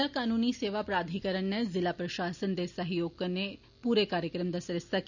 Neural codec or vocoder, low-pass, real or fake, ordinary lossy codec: none; 7.2 kHz; real; none